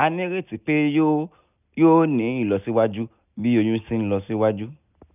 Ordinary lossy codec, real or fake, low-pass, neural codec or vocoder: none; real; 3.6 kHz; none